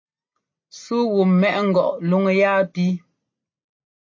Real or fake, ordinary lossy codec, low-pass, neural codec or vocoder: real; MP3, 32 kbps; 7.2 kHz; none